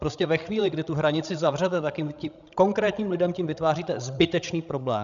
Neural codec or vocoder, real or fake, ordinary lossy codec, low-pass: codec, 16 kHz, 16 kbps, FreqCodec, larger model; fake; MP3, 96 kbps; 7.2 kHz